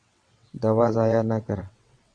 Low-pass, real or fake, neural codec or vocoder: 9.9 kHz; fake; vocoder, 22.05 kHz, 80 mel bands, WaveNeXt